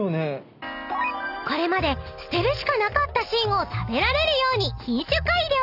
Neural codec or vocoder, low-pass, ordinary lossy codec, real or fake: none; 5.4 kHz; none; real